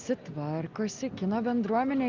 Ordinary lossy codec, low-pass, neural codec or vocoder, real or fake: Opus, 32 kbps; 7.2 kHz; none; real